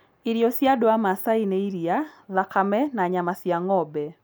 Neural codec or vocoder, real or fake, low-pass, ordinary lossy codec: none; real; none; none